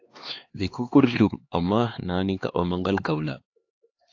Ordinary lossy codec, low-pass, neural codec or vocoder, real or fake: AAC, 48 kbps; 7.2 kHz; codec, 16 kHz, 2 kbps, X-Codec, HuBERT features, trained on LibriSpeech; fake